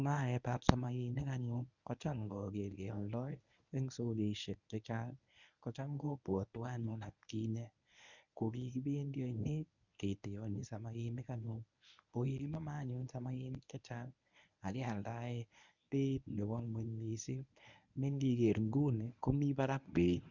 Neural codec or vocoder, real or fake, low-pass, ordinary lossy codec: codec, 24 kHz, 0.9 kbps, WavTokenizer, medium speech release version 1; fake; 7.2 kHz; none